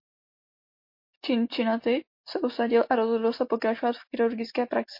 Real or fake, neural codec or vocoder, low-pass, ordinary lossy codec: real; none; 5.4 kHz; MP3, 32 kbps